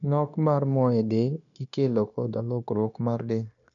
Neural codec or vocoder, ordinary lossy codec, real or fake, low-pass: codec, 16 kHz, 0.9 kbps, LongCat-Audio-Codec; none; fake; 7.2 kHz